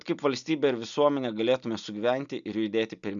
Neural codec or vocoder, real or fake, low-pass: none; real; 7.2 kHz